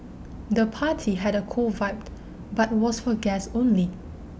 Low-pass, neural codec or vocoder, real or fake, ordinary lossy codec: none; none; real; none